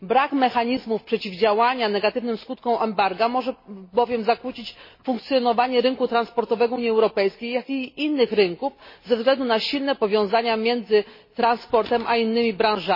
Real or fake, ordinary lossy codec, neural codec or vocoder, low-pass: real; MP3, 24 kbps; none; 5.4 kHz